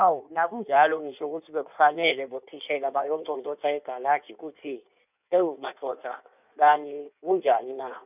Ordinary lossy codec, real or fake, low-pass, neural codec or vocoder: none; fake; 3.6 kHz; codec, 16 kHz in and 24 kHz out, 1.1 kbps, FireRedTTS-2 codec